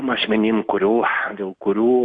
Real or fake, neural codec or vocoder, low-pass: real; none; 9.9 kHz